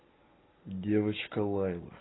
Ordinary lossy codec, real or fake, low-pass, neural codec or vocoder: AAC, 16 kbps; real; 7.2 kHz; none